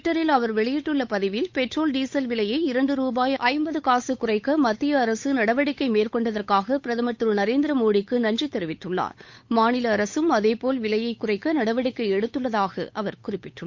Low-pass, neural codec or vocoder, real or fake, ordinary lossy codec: 7.2 kHz; codec, 16 kHz, 8 kbps, FunCodec, trained on Chinese and English, 25 frames a second; fake; MP3, 48 kbps